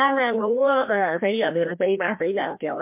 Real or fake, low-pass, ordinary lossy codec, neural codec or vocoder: fake; 3.6 kHz; MP3, 32 kbps; codec, 16 kHz, 1 kbps, FreqCodec, larger model